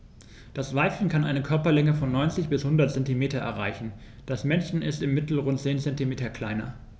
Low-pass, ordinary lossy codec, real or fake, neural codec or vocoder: none; none; real; none